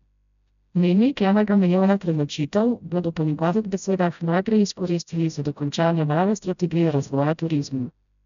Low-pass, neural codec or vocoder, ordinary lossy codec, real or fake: 7.2 kHz; codec, 16 kHz, 0.5 kbps, FreqCodec, smaller model; none; fake